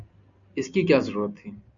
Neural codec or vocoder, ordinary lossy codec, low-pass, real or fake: none; MP3, 96 kbps; 7.2 kHz; real